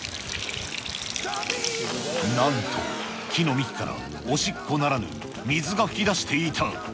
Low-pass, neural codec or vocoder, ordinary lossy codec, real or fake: none; none; none; real